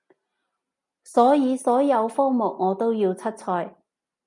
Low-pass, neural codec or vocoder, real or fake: 10.8 kHz; none; real